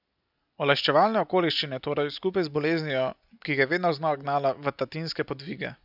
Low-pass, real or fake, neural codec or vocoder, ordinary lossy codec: 5.4 kHz; real; none; none